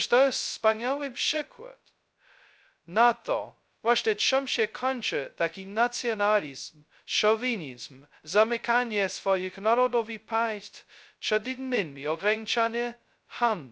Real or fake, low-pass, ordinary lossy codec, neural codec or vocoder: fake; none; none; codec, 16 kHz, 0.2 kbps, FocalCodec